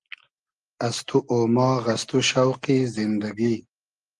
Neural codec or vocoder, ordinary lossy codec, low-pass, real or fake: none; Opus, 24 kbps; 10.8 kHz; real